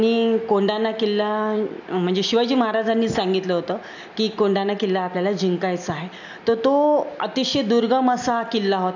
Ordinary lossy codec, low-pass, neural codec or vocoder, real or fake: none; 7.2 kHz; none; real